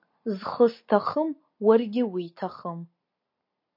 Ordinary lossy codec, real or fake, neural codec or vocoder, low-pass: MP3, 32 kbps; real; none; 5.4 kHz